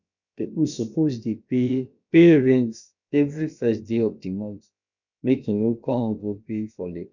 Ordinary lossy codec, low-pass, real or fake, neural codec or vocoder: none; 7.2 kHz; fake; codec, 16 kHz, about 1 kbps, DyCAST, with the encoder's durations